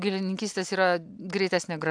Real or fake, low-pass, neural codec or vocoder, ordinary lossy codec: real; 9.9 kHz; none; AAC, 64 kbps